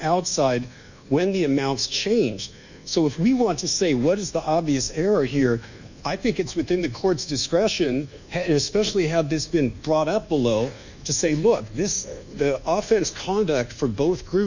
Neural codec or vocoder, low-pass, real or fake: codec, 24 kHz, 1.2 kbps, DualCodec; 7.2 kHz; fake